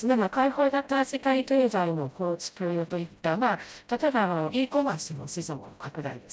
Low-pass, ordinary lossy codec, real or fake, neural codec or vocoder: none; none; fake; codec, 16 kHz, 0.5 kbps, FreqCodec, smaller model